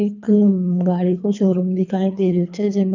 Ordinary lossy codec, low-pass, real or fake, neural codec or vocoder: none; 7.2 kHz; fake; codec, 24 kHz, 3 kbps, HILCodec